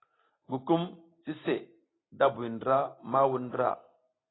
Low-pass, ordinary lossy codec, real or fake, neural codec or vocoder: 7.2 kHz; AAC, 16 kbps; real; none